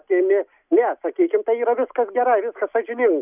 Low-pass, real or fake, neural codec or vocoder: 3.6 kHz; real; none